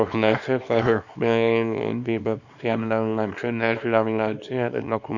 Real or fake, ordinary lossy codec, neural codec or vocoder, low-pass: fake; none; codec, 24 kHz, 0.9 kbps, WavTokenizer, small release; 7.2 kHz